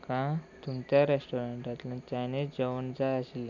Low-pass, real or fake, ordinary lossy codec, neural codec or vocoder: 7.2 kHz; real; none; none